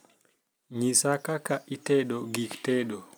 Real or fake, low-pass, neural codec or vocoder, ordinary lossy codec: real; none; none; none